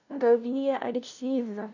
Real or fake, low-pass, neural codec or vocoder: fake; 7.2 kHz; codec, 16 kHz, 0.5 kbps, FunCodec, trained on LibriTTS, 25 frames a second